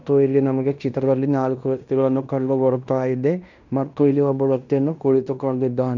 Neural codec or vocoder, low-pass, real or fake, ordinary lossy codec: codec, 16 kHz in and 24 kHz out, 0.9 kbps, LongCat-Audio-Codec, fine tuned four codebook decoder; 7.2 kHz; fake; none